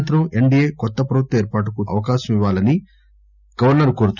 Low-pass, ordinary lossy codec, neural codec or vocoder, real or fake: 7.2 kHz; none; none; real